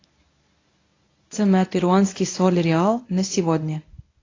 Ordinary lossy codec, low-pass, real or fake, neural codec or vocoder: AAC, 32 kbps; 7.2 kHz; fake; codec, 24 kHz, 0.9 kbps, WavTokenizer, medium speech release version 1